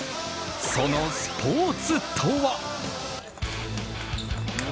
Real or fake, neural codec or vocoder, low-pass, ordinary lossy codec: real; none; none; none